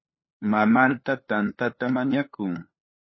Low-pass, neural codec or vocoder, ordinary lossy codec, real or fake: 7.2 kHz; codec, 16 kHz, 2 kbps, FunCodec, trained on LibriTTS, 25 frames a second; MP3, 24 kbps; fake